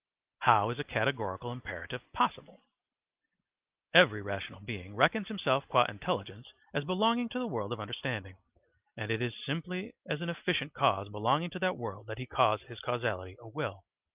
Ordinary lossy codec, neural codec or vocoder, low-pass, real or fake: Opus, 24 kbps; none; 3.6 kHz; real